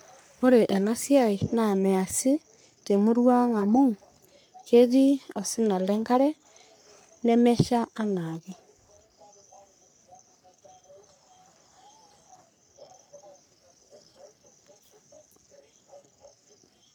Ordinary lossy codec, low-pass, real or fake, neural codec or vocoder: none; none; fake; codec, 44.1 kHz, 3.4 kbps, Pupu-Codec